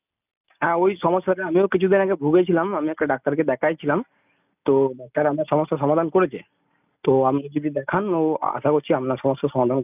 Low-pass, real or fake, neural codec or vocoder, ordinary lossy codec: 3.6 kHz; real; none; none